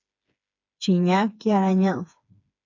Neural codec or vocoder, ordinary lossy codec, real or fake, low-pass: codec, 16 kHz, 4 kbps, FreqCodec, smaller model; AAC, 48 kbps; fake; 7.2 kHz